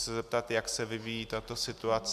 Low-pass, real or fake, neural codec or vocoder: 14.4 kHz; real; none